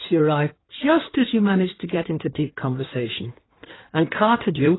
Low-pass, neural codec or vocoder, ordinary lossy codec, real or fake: 7.2 kHz; codec, 16 kHz in and 24 kHz out, 1.1 kbps, FireRedTTS-2 codec; AAC, 16 kbps; fake